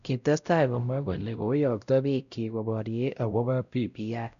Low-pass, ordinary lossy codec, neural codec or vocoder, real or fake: 7.2 kHz; none; codec, 16 kHz, 0.5 kbps, X-Codec, WavLM features, trained on Multilingual LibriSpeech; fake